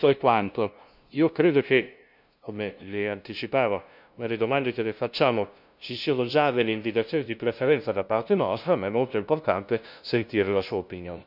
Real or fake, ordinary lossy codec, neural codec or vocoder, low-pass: fake; none; codec, 16 kHz, 0.5 kbps, FunCodec, trained on LibriTTS, 25 frames a second; 5.4 kHz